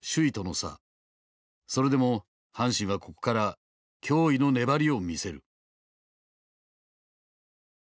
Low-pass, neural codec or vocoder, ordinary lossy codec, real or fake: none; none; none; real